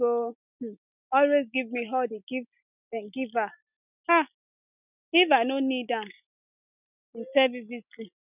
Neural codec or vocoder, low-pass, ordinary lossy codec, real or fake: none; 3.6 kHz; none; real